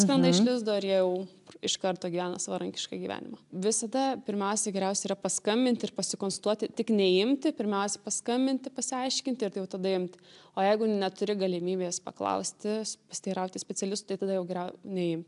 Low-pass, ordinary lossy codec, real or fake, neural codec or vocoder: 10.8 kHz; AAC, 96 kbps; real; none